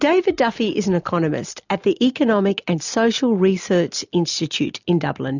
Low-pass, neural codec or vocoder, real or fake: 7.2 kHz; none; real